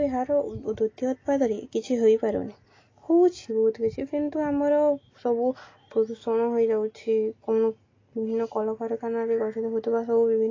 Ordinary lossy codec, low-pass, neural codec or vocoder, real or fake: AAC, 32 kbps; 7.2 kHz; none; real